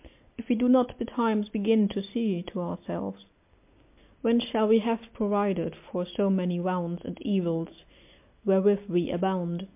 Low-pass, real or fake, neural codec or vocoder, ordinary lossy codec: 3.6 kHz; real; none; MP3, 32 kbps